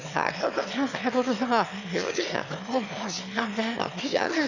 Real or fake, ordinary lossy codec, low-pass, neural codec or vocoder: fake; none; 7.2 kHz; autoencoder, 22.05 kHz, a latent of 192 numbers a frame, VITS, trained on one speaker